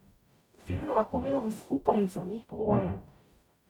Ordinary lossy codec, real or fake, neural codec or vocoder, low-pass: none; fake; codec, 44.1 kHz, 0.9 kbps, DAC; 19.8 kHz